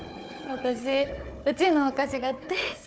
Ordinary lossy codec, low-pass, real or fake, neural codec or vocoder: none; none; fake; codec, 16 kHz, 4 kbps, FunCodec, trained on Chinese and English, 50 frames a second